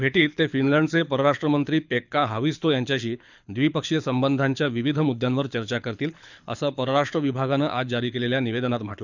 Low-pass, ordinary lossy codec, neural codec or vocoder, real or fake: 7.2 kHz; none; codec, 24 kHz, 6 kbps, HILCodec; fake